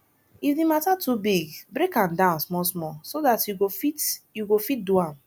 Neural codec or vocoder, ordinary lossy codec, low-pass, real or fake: none; none; none; real